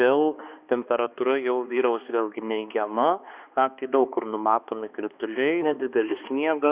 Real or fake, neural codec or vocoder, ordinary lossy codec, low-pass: fake; codec, 16 kHz, 2 kbps, X-Codec, HuBERT features, trained on balanced general audio; Opus, 64 kbps; 3.6 kHz